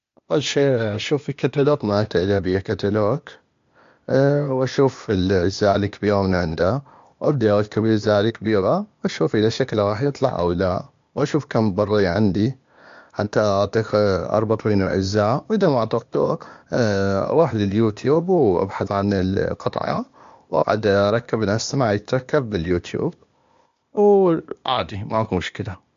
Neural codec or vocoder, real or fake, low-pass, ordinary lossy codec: codec, 16 kHz, 0.8 kbps, ZipCodec; fake; 7.2 kHz; MP3, 48 kbps